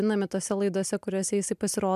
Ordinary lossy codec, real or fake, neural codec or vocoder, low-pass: MP3, 96 kbps; real; none; 14.4 kHz